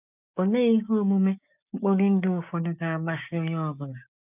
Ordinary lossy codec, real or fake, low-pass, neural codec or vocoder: none; fake; 3.6 kHz; codec, 16 kHz, 6 kbps, DAC